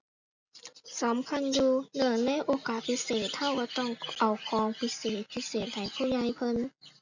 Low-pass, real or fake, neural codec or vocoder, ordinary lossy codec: 7.2 kHz; real; none; none